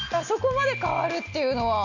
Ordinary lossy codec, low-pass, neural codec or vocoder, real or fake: none; 7.2 kHz; none; real